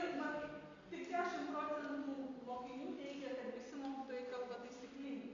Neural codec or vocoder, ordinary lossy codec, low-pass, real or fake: none; MP3, 48 kbps; 7.2 kHz; real